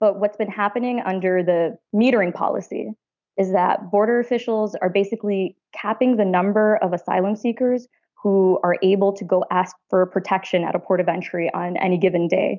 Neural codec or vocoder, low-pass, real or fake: none; 7.2 kHz; real